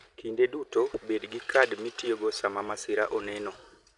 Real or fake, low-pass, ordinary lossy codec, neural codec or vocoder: real; 10.8 kHz; none; none